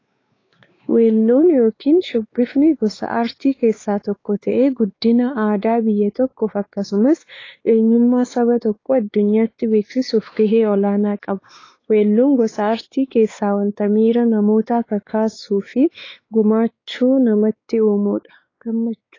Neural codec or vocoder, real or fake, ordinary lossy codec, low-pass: codec, 16 kHz, 4 kbps, X-Codec, WavLM features, trained on Multilingual LibriSpeech; fake; AAC, 32 kbps; 7.2 kHz